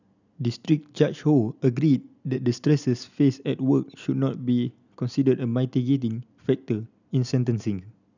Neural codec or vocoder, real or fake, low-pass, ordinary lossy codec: none; real; 7.2 kHz; none